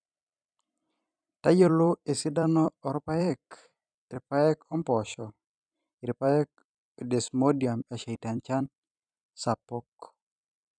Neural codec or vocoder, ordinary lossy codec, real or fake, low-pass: vocoder, 44.1 kHz, 128 mel bands every 256 samples, BigVGAN v2; none; fake; 9.9 kHz